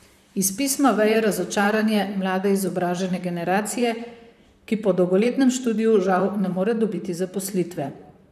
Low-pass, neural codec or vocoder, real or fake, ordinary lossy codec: 14.4 kHz; vocoder, 44.1 kHz, 128 mel bands, Pupu-Vocoder; fake; none